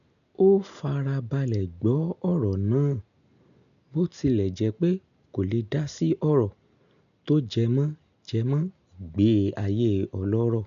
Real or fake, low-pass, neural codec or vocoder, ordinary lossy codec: real; 7.2 kHz; none; none